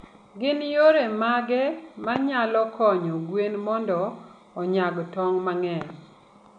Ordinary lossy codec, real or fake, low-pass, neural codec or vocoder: none; real; 9.9 kHz; none